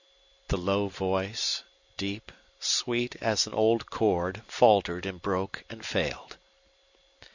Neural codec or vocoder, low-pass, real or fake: none; 7.2 kHz; real